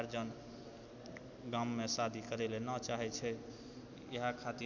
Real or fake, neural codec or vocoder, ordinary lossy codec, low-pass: real; none; MP3, 64 kbps; 7.2 kHz